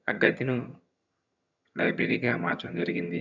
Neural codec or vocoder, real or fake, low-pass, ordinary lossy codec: vocoder, 22.05 kHz, 80 mel bands, HiFi-GAN; fake; 7.2 kHz; none